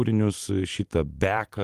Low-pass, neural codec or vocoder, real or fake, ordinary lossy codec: 14.4 kHz; vocoder, 44.1 kHz, 128 mel bands every 256 samples, BigVGAN v2; fake; Opus, 24 kbps